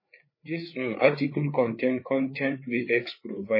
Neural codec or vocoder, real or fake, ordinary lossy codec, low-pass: codec, 16 kHz, 4 kbps, FreqCodec, larger model; fake; MP3, 24 kbps; 5.4 kHz